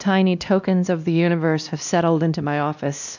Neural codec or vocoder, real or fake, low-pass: codec, 16 kHz, 1 kbps, X-Codec, WavLM features, trained on Multilingual LibriSpeech; fake; 7.2 kHz